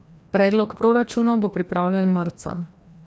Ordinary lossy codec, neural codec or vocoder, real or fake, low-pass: none; codec, 16 kHz, 1 kbps, FreqCodec, larger model; fake; none